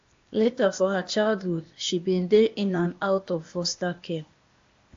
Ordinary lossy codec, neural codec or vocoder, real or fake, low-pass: AAC, 48 kbps; codec, 16 kHz, 0.8 kbps, ZipCodec; fake; 7.2 kHz